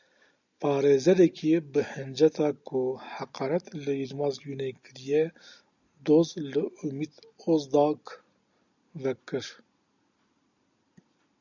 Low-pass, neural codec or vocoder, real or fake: 7.2 kHz; none; real